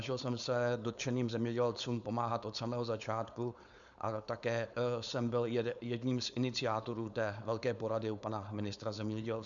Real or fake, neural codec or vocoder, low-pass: fake; codec, 16 kHz, 4.8 kbps, FACodec; 7.2 kHz